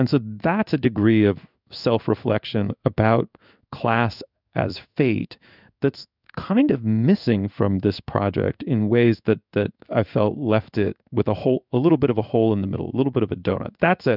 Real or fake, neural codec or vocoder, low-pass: fake; codec, 16 kHz in and 24 kHz out, 1 kbps, XY-Tokenizer; 5.4 kHz